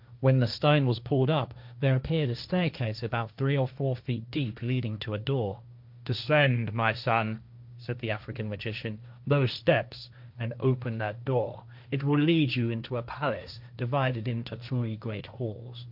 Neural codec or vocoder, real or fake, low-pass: codec, 16 kHz, 1.1 kbps, Voila-Tokenizer; fake; 5.4 kHz